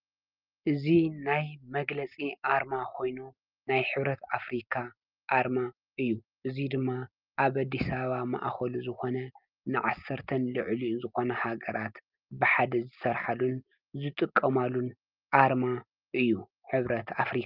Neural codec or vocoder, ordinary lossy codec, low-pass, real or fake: none; Opus, 24 kbps; 5.4 kHz; real